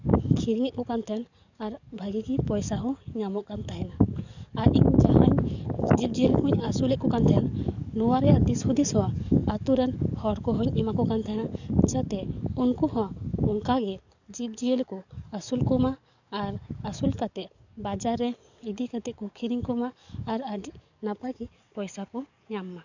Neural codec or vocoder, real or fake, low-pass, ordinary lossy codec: codec, 44.1 kHz, 7.8 kbps, DAC; fake; 7.2 kHz; none